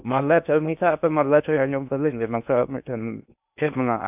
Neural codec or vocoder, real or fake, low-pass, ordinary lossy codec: codec, 16 kHz in and 24 kHz out, 0.6 kbps, FocalCodec, streaming, 2048 codes; fake; 3.6 kHz; none